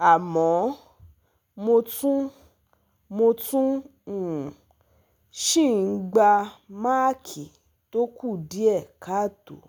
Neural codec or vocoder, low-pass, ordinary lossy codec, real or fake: none; none; none; real